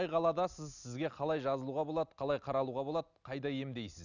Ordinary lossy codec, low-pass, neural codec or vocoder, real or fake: none; 7.2 kHz; none; real